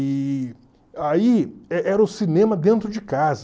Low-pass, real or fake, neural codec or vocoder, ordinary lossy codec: none; real; none; none